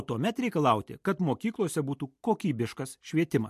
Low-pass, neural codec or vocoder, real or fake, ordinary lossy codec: 14.4 kHz; none; real; MP3, 64 kbps